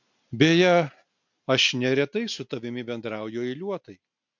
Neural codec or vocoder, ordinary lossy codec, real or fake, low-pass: none; MP3, 64 kbps; real; 7.2 kHz